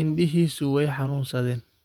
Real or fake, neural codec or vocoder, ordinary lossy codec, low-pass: fake; vocoder, 48 kHz, 128 mel bands, Vocos; none; 19.8 kHz